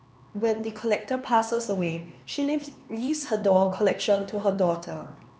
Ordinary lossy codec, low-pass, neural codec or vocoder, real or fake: none; none; codec, 16 kHz, 2 kbps, X-Codec, HuBERT features, trained on LibriSpeech; fake